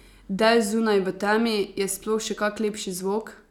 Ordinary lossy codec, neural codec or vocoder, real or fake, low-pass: none; none; real; 19.8 kHz